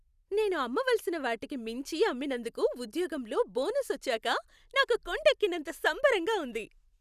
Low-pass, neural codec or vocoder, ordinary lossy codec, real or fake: 14.4 kHz; none; none; real